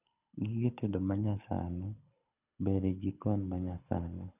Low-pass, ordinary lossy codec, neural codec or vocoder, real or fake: 3.6 kHz; none; codec, 24 kHz, 6 kbps, HILCodec; fake